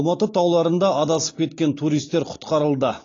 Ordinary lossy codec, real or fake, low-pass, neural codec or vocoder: AAC, 32 kbps; real; 7.2 kHz; none